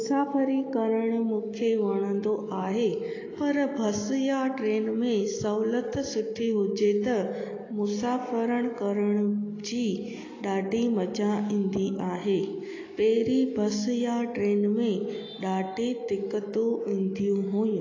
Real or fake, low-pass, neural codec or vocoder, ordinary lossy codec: real; 7.2 kHz; none; AAC, 32 kbps